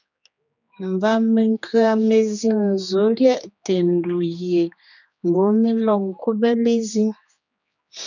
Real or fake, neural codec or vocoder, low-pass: fake; codec, 16 kHz, 2 kbps, X-Codec, HuBERT features, trained on general audio; 7.2 kHz